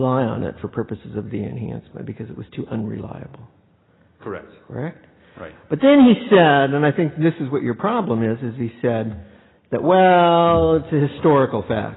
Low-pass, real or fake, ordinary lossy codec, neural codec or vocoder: 7.2 kHz; real; AAC, 16 kbps; none